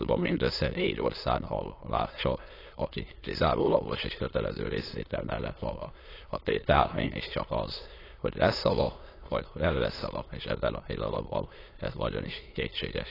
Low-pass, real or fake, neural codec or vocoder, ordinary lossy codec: 5.4 kHz; fake; autoencoder, 22.05 kHz, a latent of 192 numbers a frame, VITS, trained on many speakers; AAC, 24 kbps